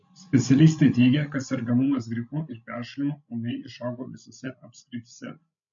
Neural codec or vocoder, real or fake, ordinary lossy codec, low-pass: codec, 16 kHz, 16 kbps, FreqCodec, larger model; fake; MP3, 48 kbps; 7.2 kHz